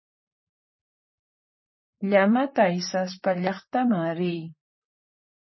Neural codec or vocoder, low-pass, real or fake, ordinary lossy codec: vocoder, 44.1 kHz, 128 mel bands, Pupu-Vocoder; 7.2 kHz; fake; MP3, 24 kbps